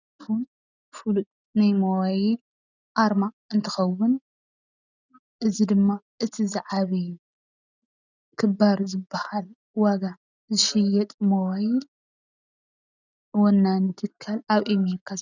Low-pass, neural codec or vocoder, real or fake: 7.2 kHz; none; real